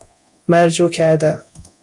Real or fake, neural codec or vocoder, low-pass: fake; codec, 24 kHz, 0.9 kbps, DualCodec; 10.8 kHz